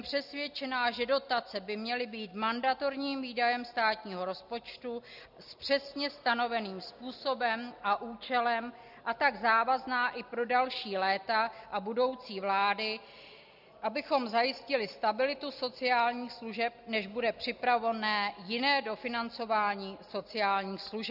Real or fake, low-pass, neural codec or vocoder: real; 5.4 kHz; none